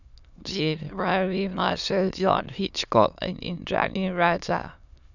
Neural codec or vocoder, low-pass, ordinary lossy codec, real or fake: autoencoder, 22.05 kHz, a latent of 192 numbers a frame, VITS, trained on many speakers; 7.2 kHz; none; fake